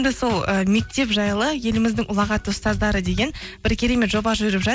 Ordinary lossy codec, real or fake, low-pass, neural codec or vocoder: none; real; none; none